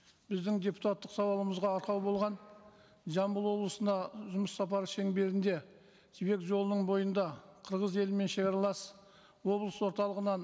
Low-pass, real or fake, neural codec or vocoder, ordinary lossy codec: none; real; none; none